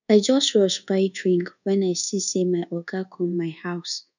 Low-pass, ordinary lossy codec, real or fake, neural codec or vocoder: 7.2 kHz; none; fake; codec, 24 kHz, 1.2 kbps, DualCodec